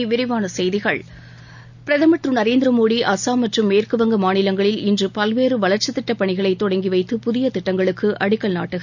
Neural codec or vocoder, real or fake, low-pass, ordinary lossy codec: none; real; 7.2 kHz; none